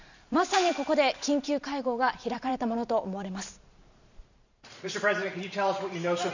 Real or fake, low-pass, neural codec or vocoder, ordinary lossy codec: fake; 7.2 kHz; vocoder, 44.1 kHz, 128 mel bands every 512 samples, BigVGAN v2; none